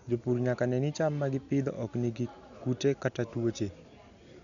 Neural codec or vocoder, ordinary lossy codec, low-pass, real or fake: none; none; 7.2 kHz; real